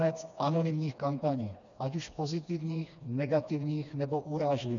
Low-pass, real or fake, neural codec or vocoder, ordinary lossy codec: 7.2 kHz; fake; codec, 16 kHz, 2 kbps, FreqCodec, smaller model; MP3, 96 kbps